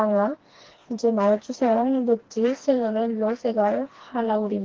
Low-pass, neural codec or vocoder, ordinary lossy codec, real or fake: 7.2 kHz; codec, 16 kHz, 2 kbps, FreqCodec, smaller model; Opus, 16 kbps; fake